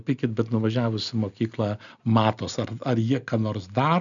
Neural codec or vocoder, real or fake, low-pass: none; real; 7.2 kHz